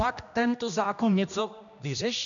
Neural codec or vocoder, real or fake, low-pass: codec, 16 kHz, 1 kbps, X-Codec, HuBERT features, trained on general audio; fake; 7.2 kHz